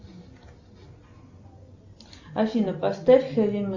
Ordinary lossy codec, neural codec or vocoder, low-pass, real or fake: Opus, 64 kbps; none; 7.2 kHz; real